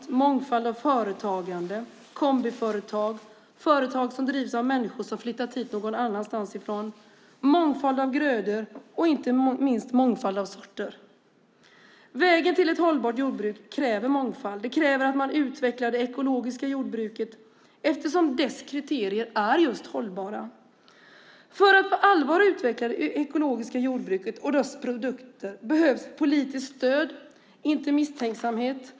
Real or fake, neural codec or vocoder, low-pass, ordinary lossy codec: real; none; none; none